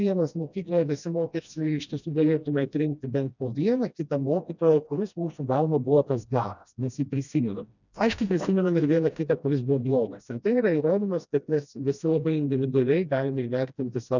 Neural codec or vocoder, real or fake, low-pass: codec, 16 kHz, 1 kbps, FreqCodec, smaller model; fake; 7.2 kHz